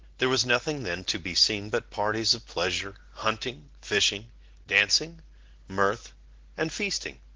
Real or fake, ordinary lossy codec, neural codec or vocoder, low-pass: real; Opus, 16 kbps; none; 7.2 kHz